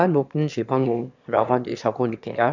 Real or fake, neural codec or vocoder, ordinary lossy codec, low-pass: fake; autoencoder, 22.05 kHz, a latent of 192 numbers a frame, VITS, trained on one speaker; none; 7.2 kHz